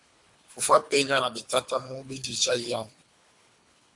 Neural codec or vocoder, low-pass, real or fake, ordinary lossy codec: codec, 24 kHz, 3 kbps, HILCodec; 10.8 kHz; fake; MP3, 96 kbps